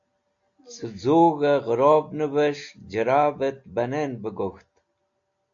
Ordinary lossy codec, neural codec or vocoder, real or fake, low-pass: AAC, 64 kbps; none; real; 7.2 kHz